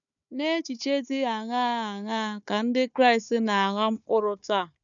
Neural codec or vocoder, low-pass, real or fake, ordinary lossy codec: codec, 16 kHz, 4 kbps, FunCodec, trained on Chinese and English, 50 frames a second; 7.2 kHz; fake; none